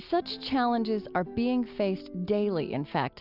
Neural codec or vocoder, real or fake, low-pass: none; real; 5.4 kHz